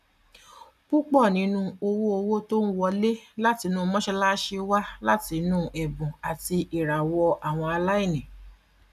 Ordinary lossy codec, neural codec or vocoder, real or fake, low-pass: none; none; real; 14.4 kHz